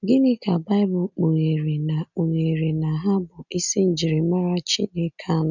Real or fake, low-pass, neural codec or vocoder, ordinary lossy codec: real; 7.2 kHz; none; none